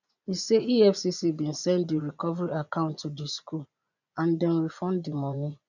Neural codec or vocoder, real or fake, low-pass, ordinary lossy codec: vocoder, 24 kHz, 100 mel bands, Vocos; fake; 7.2 kHz; none